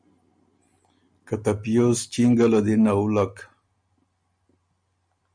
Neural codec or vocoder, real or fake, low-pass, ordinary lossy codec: none; real; 9.9 kHz; MP3, 96 kbps